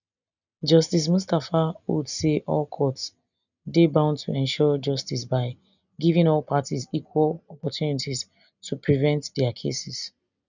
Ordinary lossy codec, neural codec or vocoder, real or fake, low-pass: none; none; real; 7.2 kHz